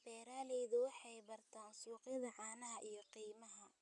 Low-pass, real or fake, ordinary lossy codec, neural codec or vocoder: 10.8 kHz; real; none; none